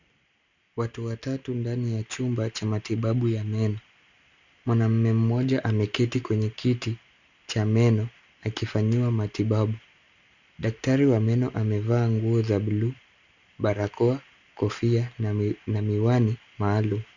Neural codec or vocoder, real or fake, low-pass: none; real; 7.2 kHz